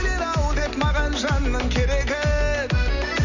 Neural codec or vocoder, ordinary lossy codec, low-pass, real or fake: none; none; 7.2 kHz; real